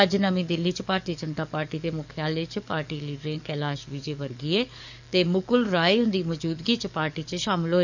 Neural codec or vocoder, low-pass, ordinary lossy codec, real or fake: codec, 44.1 kHz, 7.8 kbps, DAC; 7.2 kHz; none; fake